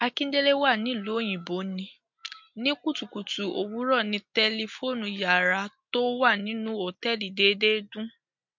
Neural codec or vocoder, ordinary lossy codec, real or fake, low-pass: none; MP3, 48 kbps; real; 7.2 kHz